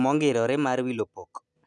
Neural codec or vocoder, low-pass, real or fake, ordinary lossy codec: none; 10.8 kHz; real; none